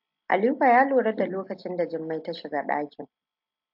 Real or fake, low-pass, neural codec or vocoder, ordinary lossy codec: real; 5.4 kHz; none; AAC, 48 kbps